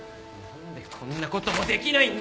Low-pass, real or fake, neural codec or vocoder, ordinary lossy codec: none; real; none; none